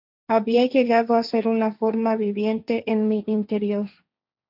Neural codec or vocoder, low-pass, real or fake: codec, 16 kHz, 1.1 kbps, Voila-Tokenizer; 5.4 kHz; fake